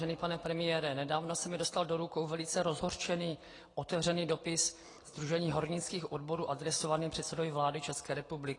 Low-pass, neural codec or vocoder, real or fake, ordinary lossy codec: 10.8 kHz; none; real; AAC, 32 kbps